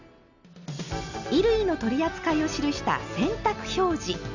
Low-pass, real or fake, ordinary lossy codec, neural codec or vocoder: 7.2 kHz; real; none; none